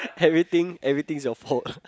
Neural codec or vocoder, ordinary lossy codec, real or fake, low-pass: none; none; real; none